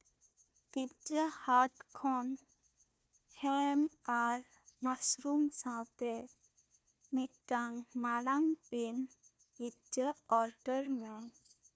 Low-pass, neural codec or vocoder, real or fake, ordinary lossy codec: none; codec, 16 kHz, 1 kbps, FunCodec, trained on Chinese and English, 50 frames a second; fake; none